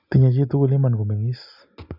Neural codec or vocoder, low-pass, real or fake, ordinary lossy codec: none; 5.4 kHz; real; none